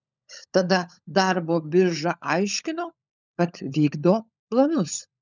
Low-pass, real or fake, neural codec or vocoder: 7.2 kHz; fake; codec, 16 kHz, 16 kbps, FunCodec, trained on LibriTTS, 50 frames a second